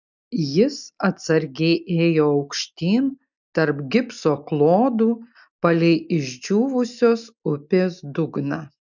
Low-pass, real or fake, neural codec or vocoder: 7.2 kHz; real; none